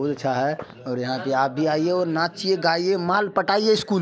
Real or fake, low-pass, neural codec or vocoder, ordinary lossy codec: real; none; none; none